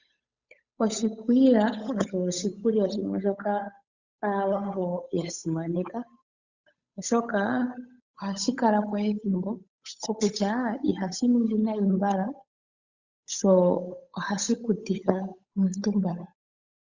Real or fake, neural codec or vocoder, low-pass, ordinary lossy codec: fake; codec, 16 kHz, 8 kbps, FunCodec, trained on Chinese and English, 25 frames a second; 7.2 kHz; Opus, 64 kbps